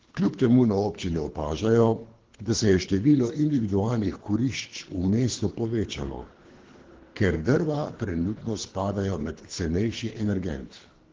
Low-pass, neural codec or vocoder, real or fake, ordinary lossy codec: 7.2 kHz; codec, 24 kHz, 3 kbps, HILCodec; fake; Opus, 16 kbps